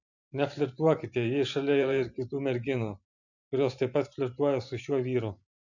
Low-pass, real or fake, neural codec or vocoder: 7.2 kHz; fake; vocoder, 44.1 kHz, 128 mel bands every 512 samples, BigVGAN v2